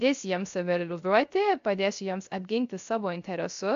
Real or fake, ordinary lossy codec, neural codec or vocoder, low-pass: fake; AAC, 64 kbps; codec, 16 kHz, 0.3 kbps, FocalCodec; 7.2 kHz